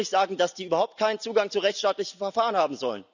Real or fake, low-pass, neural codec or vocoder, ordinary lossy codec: real; 7.2 kHz; none; none